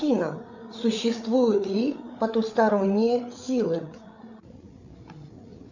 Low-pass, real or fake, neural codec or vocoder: 7.2 kHz; fake; codec, 16 kHz, 8 kbps, FreqCodec, larger model